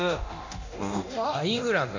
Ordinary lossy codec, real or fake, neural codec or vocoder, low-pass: AAC, 48 kbps; fake; codec, 24 kHz, 0.9 kbps, DualCodec; 7.2 kHz